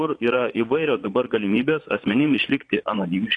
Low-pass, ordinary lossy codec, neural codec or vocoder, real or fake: 9.9 kHz; AAC, 48 kbps; vocoder, 22.05 kHz, 80 mel bands, Vocos; fake